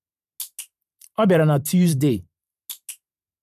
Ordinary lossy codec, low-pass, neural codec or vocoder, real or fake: none; 14.4 kHz; vocoder, 44.1 kHz, 128 mel bands every 512 samples, BigVGAN v2; fake